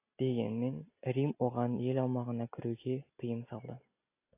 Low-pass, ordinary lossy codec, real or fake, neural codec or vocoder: 3.6 kHz; AAC, 24 kbps; real; none